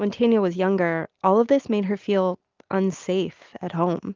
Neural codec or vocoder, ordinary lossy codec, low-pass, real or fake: none; Opus, 32 kbps; 7.2 kHz; real